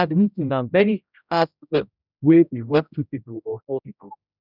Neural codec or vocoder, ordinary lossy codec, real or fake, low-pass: codec, 16 kHz, 0.5 kbps, X-Codec, HuBERT features, trained on general audio; none; fake; 5.4 kHz